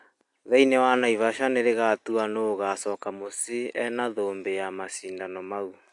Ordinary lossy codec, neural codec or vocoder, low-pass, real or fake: AAC, 64 kbps; none; 10.8 kHz; real